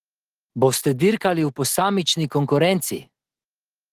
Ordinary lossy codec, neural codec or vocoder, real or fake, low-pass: Opus, 16 kbps; none; real; 14.4 kHz